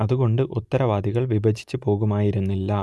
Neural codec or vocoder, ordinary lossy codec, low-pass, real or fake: none; none; none; real